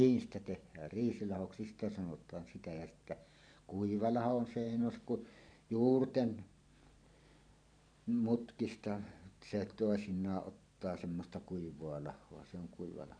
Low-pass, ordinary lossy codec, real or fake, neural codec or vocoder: 9.9 kHz; none; fake; vocoder, 44.1 kHz, 128 mel bands every 512 samples, BigVGAN v2